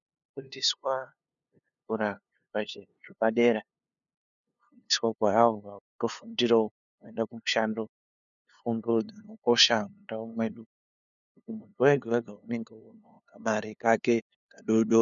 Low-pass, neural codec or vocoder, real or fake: 7.2 kHz; codec, 16 kHz, 2 kbps, FunCodec, trained on LibriTTS, 25 frames a second; fake